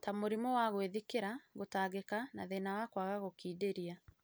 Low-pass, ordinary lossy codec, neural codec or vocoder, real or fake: none; none; none; real